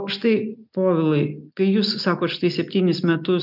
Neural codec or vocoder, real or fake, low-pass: none; real; 5.4 kHz